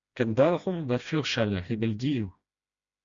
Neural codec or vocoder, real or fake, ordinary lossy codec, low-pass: codec, 16 kHz, 1 kbps, FreqCodec, smaller model; fake; Opus, 64 kbps; 7.2 kHz